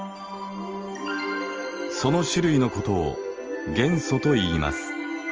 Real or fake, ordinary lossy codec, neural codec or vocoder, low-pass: real; Opus, 24 kbps; none; 7.2 kHz